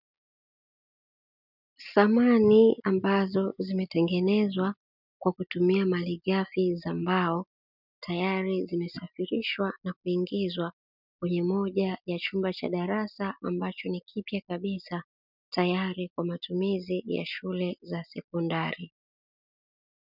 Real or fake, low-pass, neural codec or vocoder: real; 5.4 kHz; none